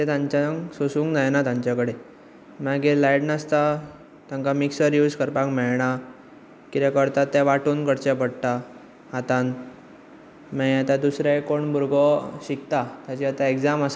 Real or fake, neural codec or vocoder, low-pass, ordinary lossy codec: real; none; none; none